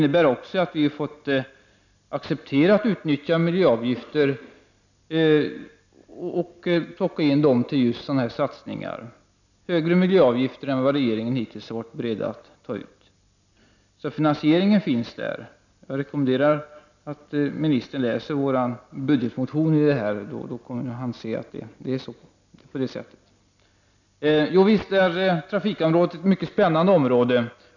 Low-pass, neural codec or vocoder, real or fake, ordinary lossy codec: 7.2 kHz; none; real; none